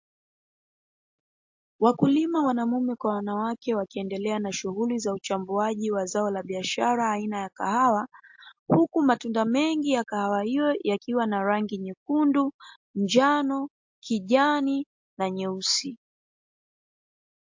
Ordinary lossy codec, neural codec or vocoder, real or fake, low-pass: MP3, 48 kbps; none; real; 7.2 kHz